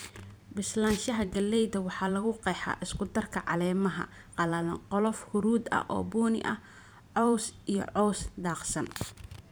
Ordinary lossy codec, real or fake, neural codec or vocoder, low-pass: none; fake; vocoder, 44.1 kHz, 128 mel bands every 512 samples, BigVGAN v2; none